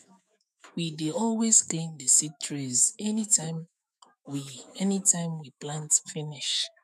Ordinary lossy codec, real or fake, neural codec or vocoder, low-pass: none; fake; autoencoder, 48 kHz, 128 numbers a frame, DAC-VAE, trained on Japanese speech; 14.4 kHz